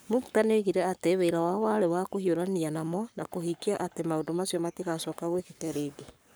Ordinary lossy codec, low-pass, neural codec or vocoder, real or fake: none; none; codec, 44.1 kHz, 7.8 kbps, Pupu-Codec; fake